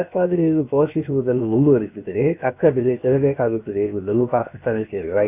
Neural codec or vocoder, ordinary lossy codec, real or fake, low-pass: codec, 16 kHz, 0.7 kbps, FocalCodec; none; fake; 3.6 kHz